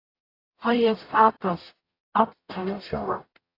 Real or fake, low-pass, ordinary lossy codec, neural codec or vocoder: fake; 5.4 kHz; AAC, 32 kbps; codec, 44.1 kHz, 0.9 kbps, DAC